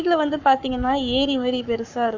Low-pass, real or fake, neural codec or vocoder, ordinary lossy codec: 7.2 kHz; fake; codec, 44.1 kHz, 7.8 kbps, Pupu-Codec; none